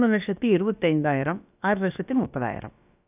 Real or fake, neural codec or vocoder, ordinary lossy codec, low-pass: fake; codec, 16 kHz, about 1 kbps, DyCAST, with the encoder's durations; none; 3.6 kHz